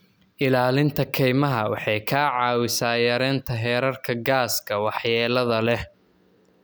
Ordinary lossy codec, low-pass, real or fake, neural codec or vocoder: none; none; real; none